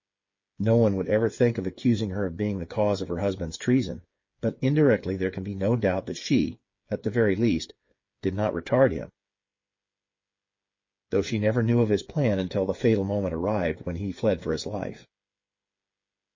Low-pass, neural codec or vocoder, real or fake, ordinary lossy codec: 7.2 kHz; codec, 16 kHz, 8 kbps, FreqCodec, smaller model; fake; MP3, 32 kbps